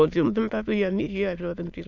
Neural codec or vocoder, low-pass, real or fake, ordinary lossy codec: autoencoder, 22.05 kHz, a latent of 192 numbers a frame, VITS, trained on many speakers; 7.2 kHz; fake; none